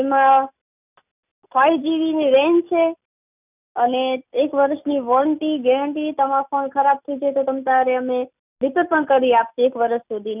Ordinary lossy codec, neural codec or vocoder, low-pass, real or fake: none; none; 3.6 kHz; real